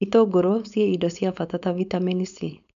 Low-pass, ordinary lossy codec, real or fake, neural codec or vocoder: 7.2 kHz; AAC, 96 kbps; fake; codec, 16 kHz, 4.8 kbps, FACodec